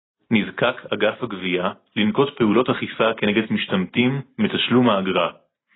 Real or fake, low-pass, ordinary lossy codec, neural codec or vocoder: real; 7.2 kHz; AAC, 16 kbps; none